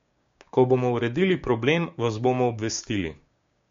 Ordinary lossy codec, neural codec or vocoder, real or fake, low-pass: MP3, 48 kbps; codec, 16 kHz, 6 kbps, DAC; fake; 7.2 kHz